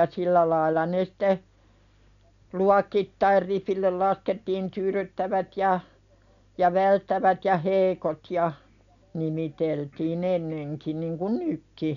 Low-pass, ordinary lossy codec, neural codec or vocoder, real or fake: 7.2 kHz; none; none; real